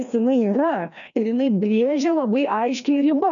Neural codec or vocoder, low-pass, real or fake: codec, 16 kHz, 1 kbps, FreqCodec, larger model; 7.2 kHz; fake